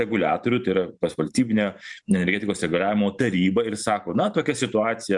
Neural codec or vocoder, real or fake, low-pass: vocoder, 44.1 kHz, 128 mel bands every 512 samples, BigVGAN v2; fake; 10.8 kHz